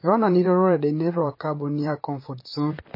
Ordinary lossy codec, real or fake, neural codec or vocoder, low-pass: MP3, 24 kbps; fake; vocoder, 44.1 kHz, 128 mel bands, Pupu-Vocoder; 5.4 kHz